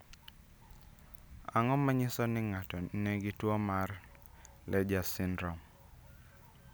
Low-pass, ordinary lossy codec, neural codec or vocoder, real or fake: none; none; none; real